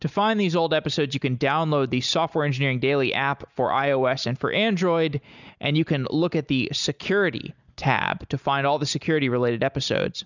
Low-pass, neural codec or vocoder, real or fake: 7.2 kHz; none; real